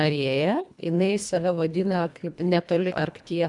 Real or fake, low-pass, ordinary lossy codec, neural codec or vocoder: fake; 10.8 kHz; MP3, 96 kbps; codec, 24 kHz, 1.5 kbps, HILCodec